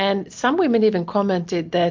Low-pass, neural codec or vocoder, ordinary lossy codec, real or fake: 7.2 kHz; none; MP3, 48 kbps; real